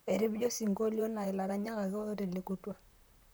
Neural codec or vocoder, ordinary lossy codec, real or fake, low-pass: vocoder, 44.1 kHz, 128 mel bands, Pupu-Vocoder; none; fake; none